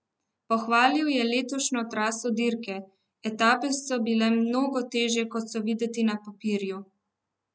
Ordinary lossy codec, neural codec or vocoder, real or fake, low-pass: none; none; real; none